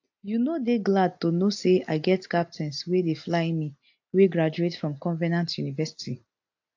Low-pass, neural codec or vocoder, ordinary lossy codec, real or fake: 7.2 kHz; none; AAC, 48 kbps; real